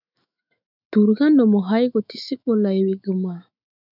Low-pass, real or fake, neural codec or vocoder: 5.4 kHz; fake; autoencoder, 48 kHz, 128 numbers a frame, DAC-VAE, trained on Japanese speech